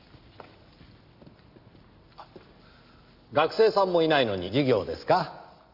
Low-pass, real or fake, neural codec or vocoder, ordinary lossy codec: 5.4 kHz; real; none; Opus, 64 kbps